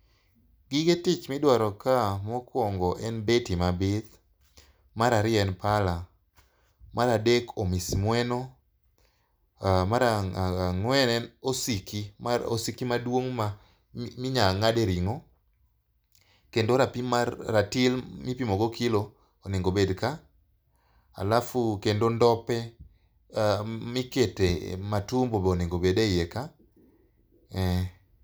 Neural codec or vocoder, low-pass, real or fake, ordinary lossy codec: none; none; real; none